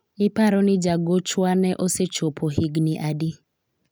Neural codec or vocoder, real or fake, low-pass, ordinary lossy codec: none; real; none; none